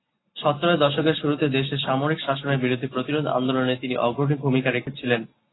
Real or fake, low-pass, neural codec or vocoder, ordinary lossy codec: real; 7.2 kHz; none; AAC, 16 kbps